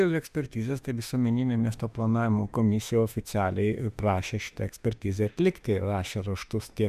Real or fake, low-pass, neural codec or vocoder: fake; 14.4 kHz; autoencoder, 48 kHz, 32 numbers a frame, DAC-VAE, trained on Japanese speech